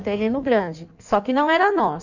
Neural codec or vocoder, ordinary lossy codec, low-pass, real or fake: codec, 16 kHz in and 24 kHz out, 1.1 kbps, FireRedTTS-2 codec; none; 7.2 kHz; fake